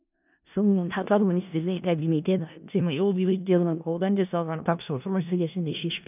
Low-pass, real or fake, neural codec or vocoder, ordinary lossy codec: 3.6 kHz; fake; codec, 16 kHz in and 24 kHz out, 0.4 kbps, LongCat-Audio-Codec, four codebook decoder; none